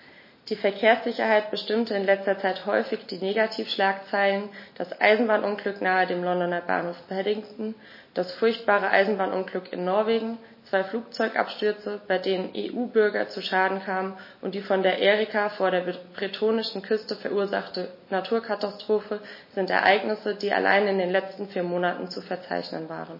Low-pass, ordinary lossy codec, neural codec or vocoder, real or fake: 5.4 kHz; MP3, 24 kbps; none; real